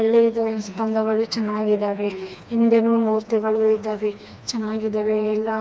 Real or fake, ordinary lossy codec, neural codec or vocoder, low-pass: fake; none; codec, 16 kHz, 2 kbps, FreqCodec, smaller model; none